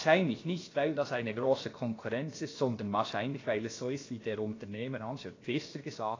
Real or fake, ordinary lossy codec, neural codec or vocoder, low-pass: fake; AAC, 32 kbps; codec, 16 kHz, about 1 kbps, DyCAST, with the encoder's durations; 7.2 kHz